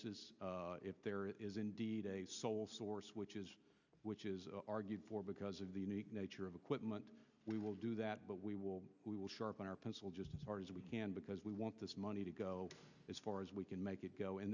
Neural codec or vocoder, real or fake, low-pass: none; real; 7.2 kHz